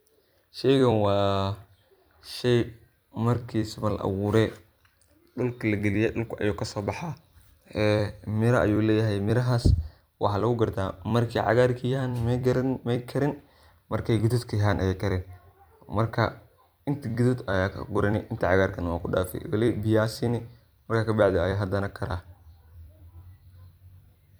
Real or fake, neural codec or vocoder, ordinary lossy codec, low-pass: fake; vocoder, 44.1 kHz, 128 mel bands every 256 samples, BigVGAN v2; none; none